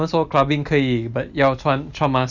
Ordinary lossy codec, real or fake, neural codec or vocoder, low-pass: none; real; none; 7.2 kHz